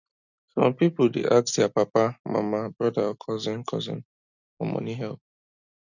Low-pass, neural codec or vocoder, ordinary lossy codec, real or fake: 7.2 kHz; none; none; real